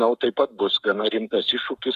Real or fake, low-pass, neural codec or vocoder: fake; 14.4 kHz; codec, 44.1 kHz, 7.8 kbps, Pupu-Codec